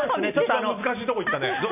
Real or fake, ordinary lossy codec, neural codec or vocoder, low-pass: real; none; none; 3.6 kHz